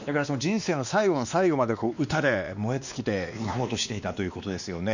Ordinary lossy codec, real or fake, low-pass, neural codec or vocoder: none; fake; 7.2 kHz; codec, 16 kHz, 2 kbps, X-Codec, WavLM features, trained on Multilingual LibriSpeech